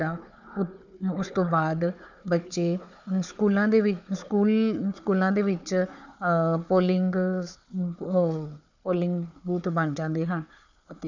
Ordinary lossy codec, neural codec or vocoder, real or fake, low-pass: none; codec, 16 kHz, 4 kbps, FunCodec, trained on Chinese and English, 50 frames a second; fake; 7.2 kHz